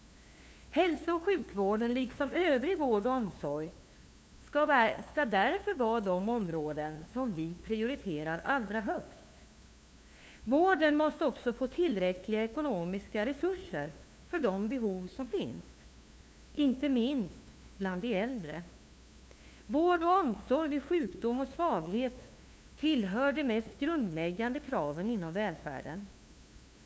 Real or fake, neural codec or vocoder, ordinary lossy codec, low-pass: fake; codec, 16 kHz, 2 kbps, FunCodec, trained on LibriTTS, 25 frames a second; none; none